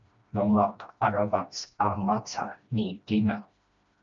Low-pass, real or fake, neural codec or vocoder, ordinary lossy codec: 7.2 kHz; fake; codec, 16 kHz, 1 kbps, FreqCodec, smaller model; MP3, 64 kbps